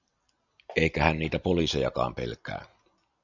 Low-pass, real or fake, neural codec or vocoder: 7.2 kHz; real; none